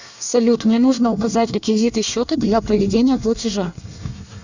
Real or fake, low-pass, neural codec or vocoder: fake; 7.2 kHz; codec, 24 kHz, 1 kbps, SNAC